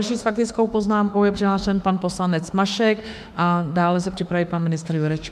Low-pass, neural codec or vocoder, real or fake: 14.4 kHz; autoencoder, 48 kHz, 32 numbers a frame, DAC-VAE, trained on Japanese speech; fake